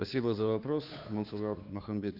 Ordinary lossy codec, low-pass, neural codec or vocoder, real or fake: Opus, 64 kbps; 5.4 kHz; codec, 16 kHz, 2 kbps, FunCodec, trained on Chinese and English, 25 frames a second; fake